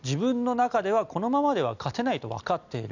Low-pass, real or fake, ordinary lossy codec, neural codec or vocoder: 7.2 kHz; real; none; none